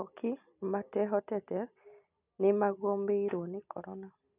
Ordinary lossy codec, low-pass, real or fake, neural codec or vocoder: none; 3.6 kHz; real; none